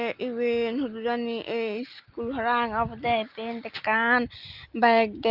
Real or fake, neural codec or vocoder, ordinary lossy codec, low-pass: real; none; Opus, 32 kbps; 5.4 kHz